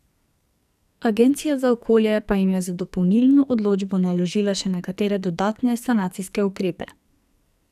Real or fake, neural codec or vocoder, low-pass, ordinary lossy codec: fake; codec, 44.1 kHz, 2.6 kbps, SNAC; 14.4 kHz; none